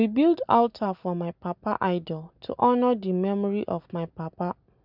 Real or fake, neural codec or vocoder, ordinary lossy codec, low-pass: real; none; none; 5.4 kHz